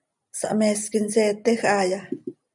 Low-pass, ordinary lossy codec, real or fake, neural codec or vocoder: 10.8 kHz; MP3, 96 kbps; real; none